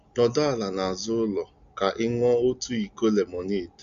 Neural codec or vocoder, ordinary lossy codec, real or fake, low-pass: none; none; real; 7.2 kHz